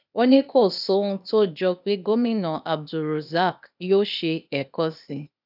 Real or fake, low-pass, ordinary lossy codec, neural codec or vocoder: fake; 5.4 kHz; none; codec, 16 kHz, 0.8 kbps, ZipCodec